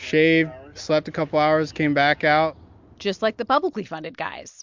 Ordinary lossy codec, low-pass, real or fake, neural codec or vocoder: MP3, 64 kbps; 7.2 kHz; real; none